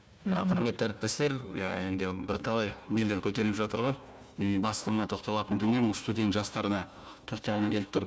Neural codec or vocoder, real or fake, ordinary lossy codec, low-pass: codec, 16 kHz, 1 kbps, FunCodec, trained on Chinese and English, 50 frames a second; fake; none; none